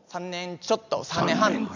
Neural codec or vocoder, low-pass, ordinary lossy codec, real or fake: none; 7.2 kHz; none; real